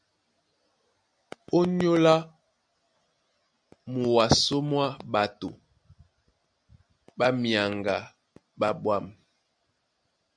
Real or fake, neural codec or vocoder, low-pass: real; none; 9.9 kHz